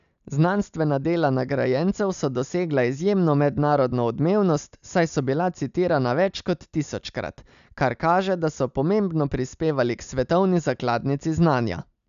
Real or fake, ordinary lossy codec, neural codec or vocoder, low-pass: real; none; none; 7.2 kHz